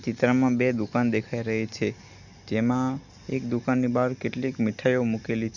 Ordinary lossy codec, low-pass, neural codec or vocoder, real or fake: none; 7.2 kHz; none; real